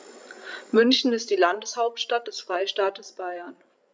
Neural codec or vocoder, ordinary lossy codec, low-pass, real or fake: codec, 16 kHz, 16 kbps, FreqCodec, larger model; none; none; fake